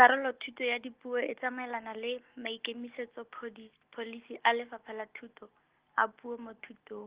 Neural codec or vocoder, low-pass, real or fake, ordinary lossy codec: none; 3.6 kHz; real; Opus, 16 kbps